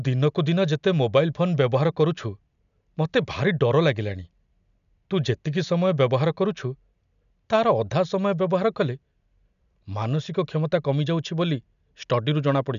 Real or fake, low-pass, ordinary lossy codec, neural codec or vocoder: real; 7.2 kHz; none; none